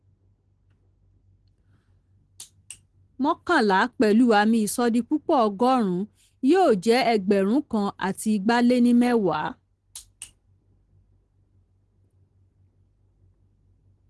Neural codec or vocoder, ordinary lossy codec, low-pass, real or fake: none; Opus, 16 kbps; 10.8 kHz; real